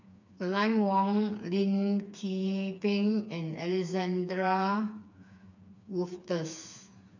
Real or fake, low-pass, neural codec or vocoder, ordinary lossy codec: fake; 7.2 kHz; codec, 16 kHz, 4 kbps, FreqCodec, smaller model; none